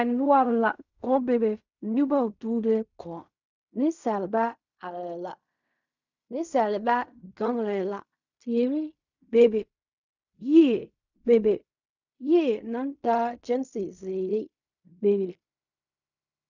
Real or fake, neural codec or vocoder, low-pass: fake; codec, 16 kHz in and 24 kHz out, 0.4 kbps, LongCat-Audio-Codec, fine tuned four codebook decoder; 7.2 kHz